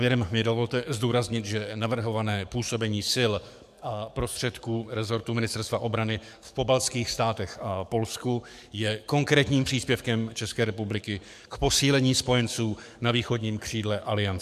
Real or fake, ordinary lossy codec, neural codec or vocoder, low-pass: fake; MP3, 96 kbps; codec, 44.1 kHz, 7.8 kbps, DAC; 14.4 kHz